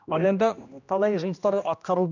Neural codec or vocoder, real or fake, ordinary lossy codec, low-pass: codec, 16 kHz, 1 kbps, X-Codec, HuBERT features, trained on general audio; fake; none; 7.2 kHz